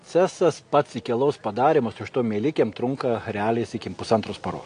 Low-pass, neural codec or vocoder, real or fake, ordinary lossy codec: 9.9 kHz; none; real; AAC, 48 kbps